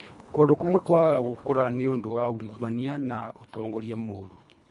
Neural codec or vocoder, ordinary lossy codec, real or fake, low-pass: codec, 24 kHz, 1.5 kbps, HILCodec; MP3, 64 kbps; fake; 10.8 kHz